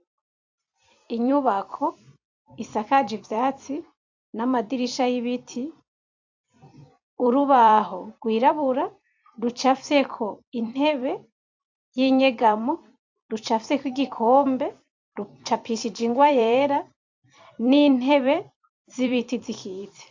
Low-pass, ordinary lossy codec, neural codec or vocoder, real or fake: 7.2 kHz; AAC, 48 kbps; vocoder, 44.1 kHz, 128 mel bands every 256 samples, BigVGAN v2; fake